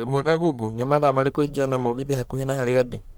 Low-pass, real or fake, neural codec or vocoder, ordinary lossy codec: none; fake; codec, 44.1 kHz, 1.7 kbps, Pupu-Codec; none